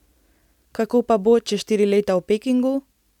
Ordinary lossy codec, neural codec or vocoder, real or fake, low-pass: none; none; real; 19.8 kHz